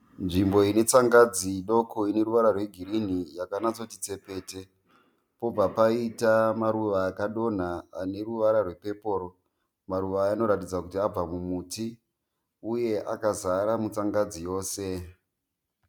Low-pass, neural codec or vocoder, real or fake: 19.8 kHz; none; real